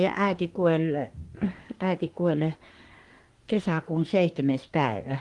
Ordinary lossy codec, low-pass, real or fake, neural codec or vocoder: Opus, 24 kbps; 10.8 kHz; fake; codec, 24 kHz, 1 kbps, SNAC